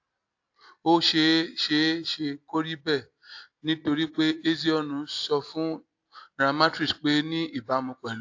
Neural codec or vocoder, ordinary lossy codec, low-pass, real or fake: none; AAC, 48 kbps; 7.2 kHz; real